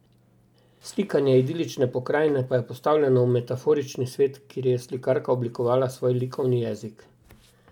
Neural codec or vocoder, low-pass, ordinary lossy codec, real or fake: none; 19.8 kHz; none; real